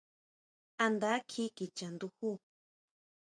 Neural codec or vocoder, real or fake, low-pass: vocoder, 24 kHz, 100 mel bands, Vocos; fake; 9.9 kHz